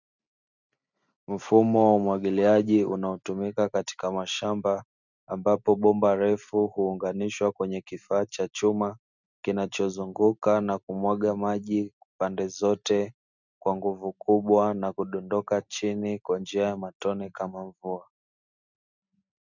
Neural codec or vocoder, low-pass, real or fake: none; 7.2 kHz; real